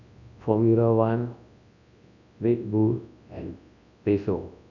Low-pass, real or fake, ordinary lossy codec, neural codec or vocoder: 7.2 kHz; fake; none; codec, 24 kHz, 0.9 kbps, WavTokenizer, large speech release